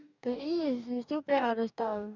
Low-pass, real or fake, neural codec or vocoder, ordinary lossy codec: 7.2 kHz; fake; codec, 44.1 kHz, 2.6 kbps, DAC; none